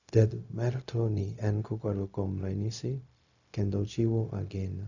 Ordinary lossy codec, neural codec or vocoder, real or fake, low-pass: none; codec, 16 kHz, 0.4 kbps, LongCat-Audio-Codec; fake; 7.2 kHz